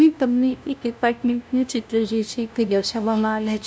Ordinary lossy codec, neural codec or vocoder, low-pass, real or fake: none; codec, 16 kHz, 0.5 kbps, FunCodec, trained on LibriTTS, 25 frames a second; none; fake